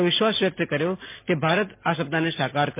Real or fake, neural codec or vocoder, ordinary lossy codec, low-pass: real; none; MP3, 24 kbps; 3.6 kHz